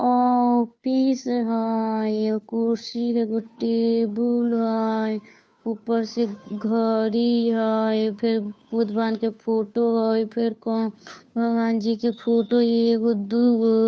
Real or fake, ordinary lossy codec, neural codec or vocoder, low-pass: fake; none; codec, 16 kHz, 2 kbps, FunCodec, trained on Chinese and English, 25 frames a second; none